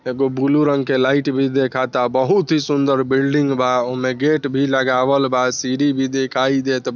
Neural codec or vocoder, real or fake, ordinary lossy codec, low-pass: none; real; none; 7.2 kHz